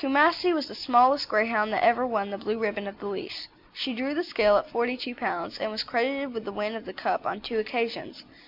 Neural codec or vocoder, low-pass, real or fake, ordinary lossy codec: none; 5.4 kHz; real; MP3, 48 kbps